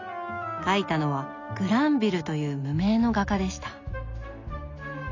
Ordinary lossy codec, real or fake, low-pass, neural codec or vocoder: none; real; 7.2 kHz; none